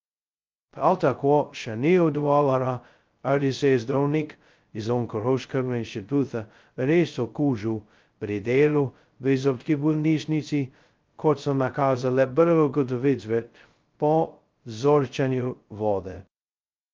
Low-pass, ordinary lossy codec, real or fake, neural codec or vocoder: 7.2 kHz; Opus, 24 kbps; fake; codec, 16 kHz, 0.2 kbps, FocalCodec